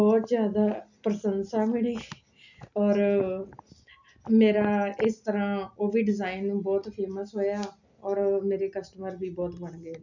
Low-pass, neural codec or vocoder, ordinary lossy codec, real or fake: 7.2 kHz; none; none; real